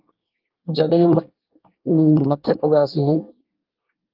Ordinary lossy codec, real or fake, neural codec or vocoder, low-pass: Opus, 24 kbps; fake; codec, 24 kHz, 1 kbps, SNAC; 5.4 kHz